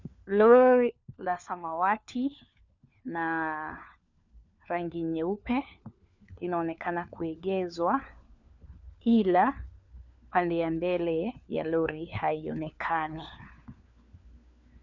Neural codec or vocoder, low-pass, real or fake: codec, 16 kHz, 4 kbps, FunCodec, trained on LibriTTS, 50 frames a second; 7.2 kHz; fake